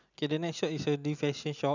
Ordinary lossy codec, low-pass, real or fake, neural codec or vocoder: none; 7.2 kHz; real; none